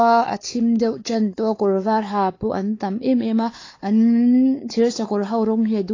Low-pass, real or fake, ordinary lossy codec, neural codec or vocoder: 7.2 kHz; fake; AAC, 32 kbps; codec, 16 kHz, 4 kbps, X-Codec, WavLM features, trained on Multilingual LibriSpeech